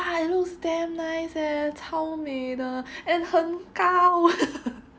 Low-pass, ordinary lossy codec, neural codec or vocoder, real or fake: none; none; none; real